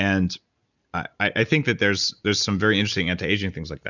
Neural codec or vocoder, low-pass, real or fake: none; 7.2 kHz; real